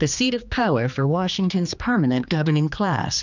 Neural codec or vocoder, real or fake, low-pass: codec, 16 kHz, 2 kbps, X-Codec, HuBERT features, trained on general audio; fake; 7.2 kHz